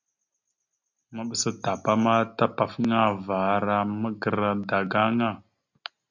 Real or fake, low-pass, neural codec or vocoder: real; 7.2 kHz; none